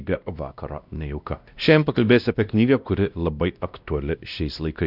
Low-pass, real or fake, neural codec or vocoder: 5.4 kHz; fake; codec, 16 kHz, 1 kbps, X-Codec, WavLM features, trained on Multilingual LibriSpeech